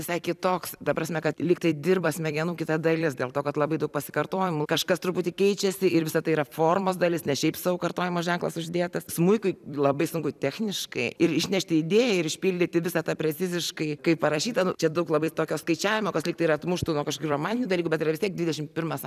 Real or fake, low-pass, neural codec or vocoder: fake; 14.4 kHz; vocoder, 44.1 kHz, 128 mel bands every 256 samples, BigVGAN v2